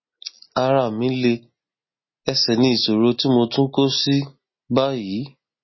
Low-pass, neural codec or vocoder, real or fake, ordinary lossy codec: 7.2 kHz; none; real; MP3, 24 kbps